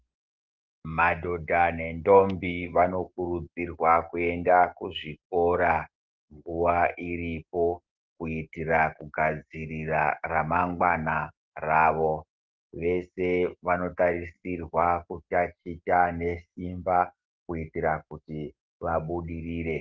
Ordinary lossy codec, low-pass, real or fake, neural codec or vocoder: Opus, 16 kbps; 7.2 kHz; real; none